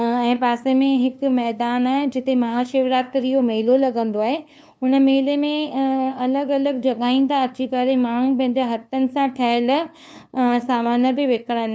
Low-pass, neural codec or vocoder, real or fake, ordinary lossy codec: none; codec, 16 kHz, 2 kbps, FunCodec, trained on LibriTTS, 25 frames a second; fake; none